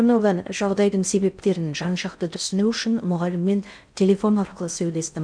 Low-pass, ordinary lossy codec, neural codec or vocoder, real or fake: 9.9 kHz; none; codec, 16 kHz in and 24 kHz out, 0.6 kbps, FocalCodec, streaming, 2048 codes; fake